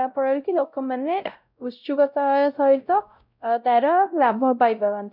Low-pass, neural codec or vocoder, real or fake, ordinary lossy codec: 5.4 kHz; codec, 16 kHz, 0.5 kbps, X-Codec, WavLM features, trained on Multilingual LibriSpeech; fake; none